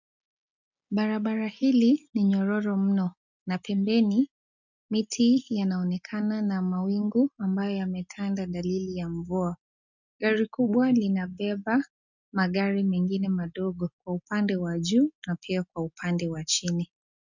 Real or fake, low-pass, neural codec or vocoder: real; 7.2 kHz; none